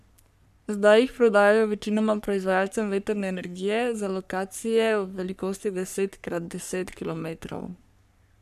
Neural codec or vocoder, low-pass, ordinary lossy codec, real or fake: codec, 44.1 kHz, 3.4 kbps, Pupu-Codec; 14.4 kHz; none; fake